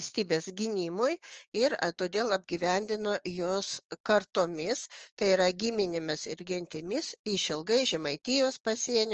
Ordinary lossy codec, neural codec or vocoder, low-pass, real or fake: Opus, 24 kbps; codec, 16 kHz, 6 kbps, DAC; 7.2 kHz; fake